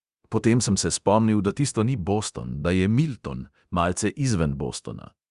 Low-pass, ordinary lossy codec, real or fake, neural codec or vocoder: 10.8 kHz; Opus, 64 kbps; fake; codec, 24 kHz, 0.9 kbps, DualCodec